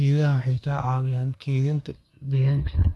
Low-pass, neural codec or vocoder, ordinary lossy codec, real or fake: none; codec, 24 kHz, 1 kbps, SNAC; none; fake